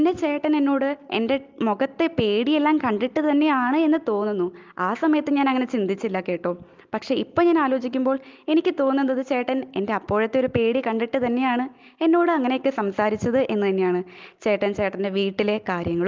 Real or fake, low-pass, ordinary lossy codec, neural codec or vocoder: real; 7.2 kHz; Opus, 32 kbps; none